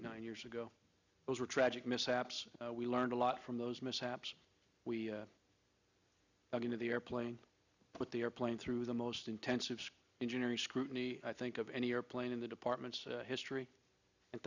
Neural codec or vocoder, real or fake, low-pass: none; real; 7.2 kHz